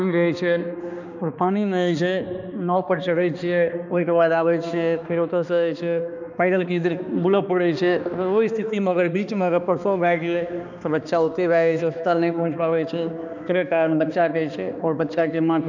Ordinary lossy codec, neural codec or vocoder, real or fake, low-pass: none; codec, 16 kHz, 2 kbps, X-Codec, HuBERT features, trained on balanced general audio; fake; 7.2 kHz